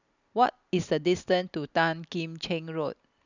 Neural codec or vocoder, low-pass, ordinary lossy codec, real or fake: none; 7.2 kHz; none; real